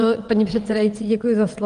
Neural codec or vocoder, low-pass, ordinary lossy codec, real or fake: vocoder, 22.05 kHz, 80 mel bands, WaveNeXt; 9.9 kHz; Opus, 24 kbps; fake